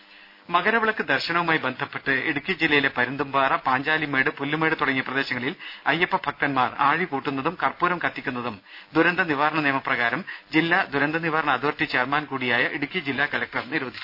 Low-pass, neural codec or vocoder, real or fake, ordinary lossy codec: 5.4 kHz; none; real; none